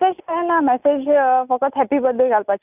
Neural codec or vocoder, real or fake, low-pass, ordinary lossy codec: none; real; 3.6 kHz; none